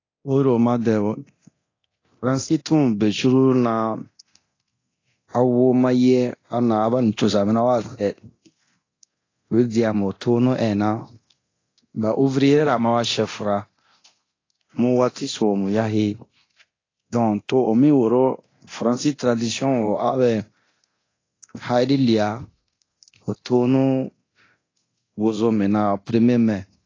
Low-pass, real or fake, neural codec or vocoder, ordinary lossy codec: 7.2 kHz; fake; codec, 24 kHz, 0.9 kbps, DualCodec; AAC, 32 kbps